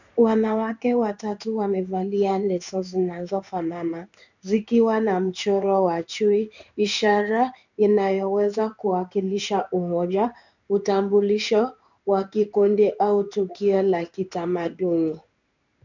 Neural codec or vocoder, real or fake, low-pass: codec, 16 kHz in and 24 kHz out, 1 kbps, XY-Tokenizer; fake; 7.2 kHz